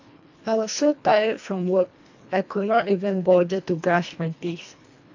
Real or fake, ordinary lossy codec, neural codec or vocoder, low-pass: fake; AAC, 48 kbps; codec, 24 kHz, 1.5 kbps, HILCodec; 7.2 kHz